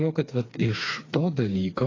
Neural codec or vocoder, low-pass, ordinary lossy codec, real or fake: codec, 16 kHz, 4 kbps, FreqCodec, smaller model; 7.2 kHz; AAC, 32 kbps; fake